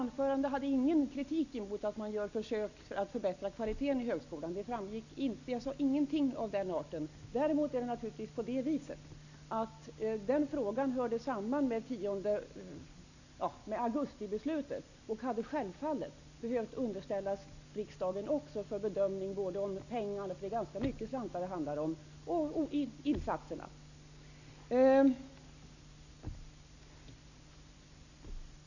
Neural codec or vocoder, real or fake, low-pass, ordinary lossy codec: none; real; 7.2 kHz; none